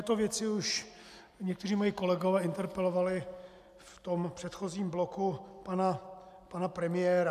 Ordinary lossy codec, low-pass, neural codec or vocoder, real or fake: MP3, 96 kbps; 14.4 kHz; none; real